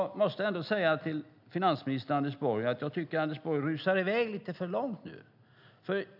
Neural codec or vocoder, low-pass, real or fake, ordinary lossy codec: none; 5.4 kHz; real; none